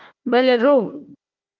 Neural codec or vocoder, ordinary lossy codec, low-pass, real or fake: codec, 16 kHz, 1 kbps, FunCodec, trained on Chinese and English, 50 frames a second; Opus, 32 kbps; 7.2 kHz; fake